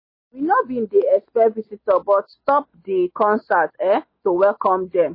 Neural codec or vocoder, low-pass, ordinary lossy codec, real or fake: none; 5.4 kHz; MP3, 24 kbps; real